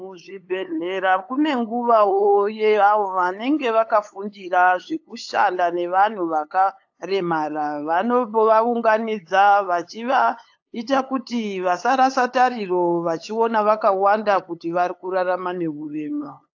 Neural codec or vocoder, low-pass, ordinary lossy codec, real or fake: codec, 16 kHz, 8 kbps, FunCodec, trained on LibriTTS, 25 frames a second; 7.2 kHz; AAC, 48 kbps; fake